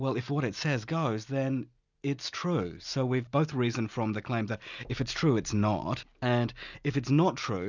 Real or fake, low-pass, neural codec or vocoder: real; 7.2 kHz; none